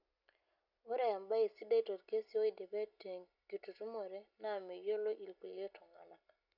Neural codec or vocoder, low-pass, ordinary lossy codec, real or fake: none; 5.4 kHz; none; real